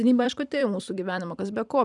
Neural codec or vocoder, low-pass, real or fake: vocoder, 44.1 kHz, 128 mel bands every 256 samples, BigVGAN v2; 10.8 kHz; fake